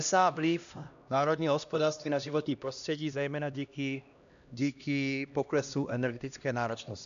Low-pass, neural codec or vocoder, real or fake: 7.2 kHz; codec, 16 kHz, 1 kbps, X-Codec, HuBERT features, trained on LibriSpeech; fake